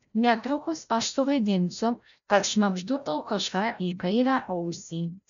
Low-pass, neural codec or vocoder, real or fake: 7.2 kHz; codec, 16 kHz, 0.5 kbps, FreqCodec, larger model; fake